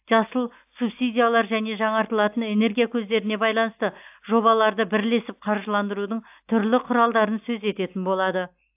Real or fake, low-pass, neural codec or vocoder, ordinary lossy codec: real; 3.6 kHz; none; AAC, 32 kbps